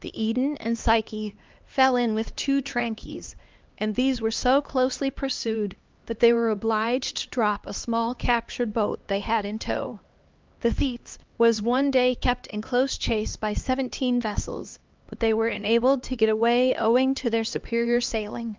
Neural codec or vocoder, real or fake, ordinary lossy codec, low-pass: codec, 16 kHz, 2 kbps, X-Codec, HuBERT features, trained on LibriSpeech; fake; Opus, 32 kbps; 7.2 kHz